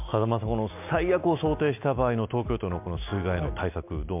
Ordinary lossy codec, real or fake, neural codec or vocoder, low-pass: MP3, 32 kbps; real; none; 3.6 kHz